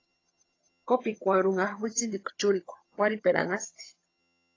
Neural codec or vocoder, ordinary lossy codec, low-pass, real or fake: vocoder, 22.05 kHz, 80 mel bands, HiFi-GAN; AAC, 32 kbps; 7.2 kHz; fake